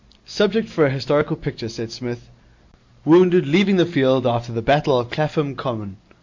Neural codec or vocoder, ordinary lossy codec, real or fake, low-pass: none; MP3, 48 kbps; real; 7.2 kHz